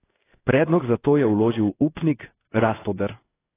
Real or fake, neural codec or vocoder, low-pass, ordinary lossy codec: fake; codec, 16 kHz in and 24 kHz out, 1 kbps, XY-Tokenizer; 3.6 kHz; AAC, 16 kbps